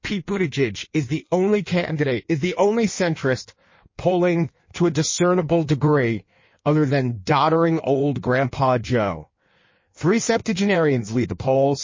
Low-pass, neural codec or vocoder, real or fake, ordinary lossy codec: 7.2 kHz; codec, 16 kHz in and 24 kHz out, 1.1 kbps, FireRedTTS-2 codec; fake; MP3, 32 kbps